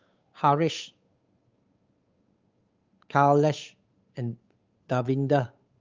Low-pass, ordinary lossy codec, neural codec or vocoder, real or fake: 7.2 kHz; Opus, 24 kbps; codec, 16 kHz, 8 kbps, FunCodec, trained on Chinese and English, 25 frames a second; fake